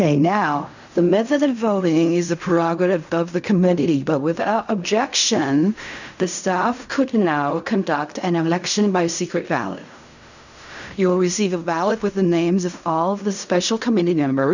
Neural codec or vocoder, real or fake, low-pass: codec, 16 kHz in and 24 kHz out, 0.4 kbps, LongCat-Audio-Codec, fine tuned four codebook decoder; fake; 7.2 kHz